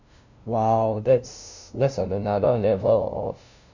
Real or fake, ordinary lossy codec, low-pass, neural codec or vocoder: fake; none; 7.2 kHz; codec, 16 kHz, 0.5 kbps, FunCodec, trained on LibriTTS, 25 frames a second